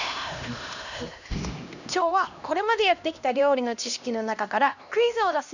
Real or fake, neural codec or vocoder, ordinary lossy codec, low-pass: fake; codec, 16 kHz, 1 kbps, X-Codec, HuBERT features, trained on LibriSpeech; none; 7.2 kHz